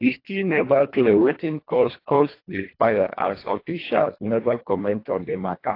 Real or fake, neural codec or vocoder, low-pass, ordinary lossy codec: fake; codec, 24 kHz, 1.5 kbps, HILCodec; 5.4 kHz; AAC, 32 kbps